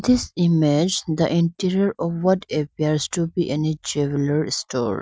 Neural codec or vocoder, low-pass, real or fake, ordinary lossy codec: none; none; real; none